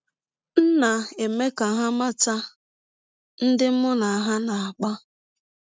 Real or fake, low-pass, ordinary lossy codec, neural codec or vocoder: real; none; none; none